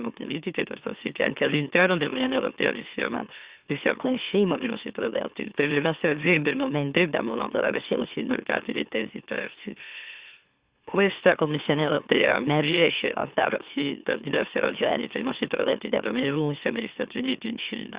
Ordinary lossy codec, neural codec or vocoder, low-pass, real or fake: Opus, 64 kbps; autoencoder, 44.1 kHz, a latent of 192 numbers a frame, MeloTTS; 3.6 kHz; fake